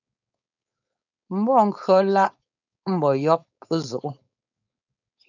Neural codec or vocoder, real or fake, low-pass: codec, 16 kHz, 4.8 kbps, FACodec; fake; 7.2 kHz